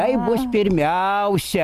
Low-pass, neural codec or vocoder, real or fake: 14.4 kHz; none; real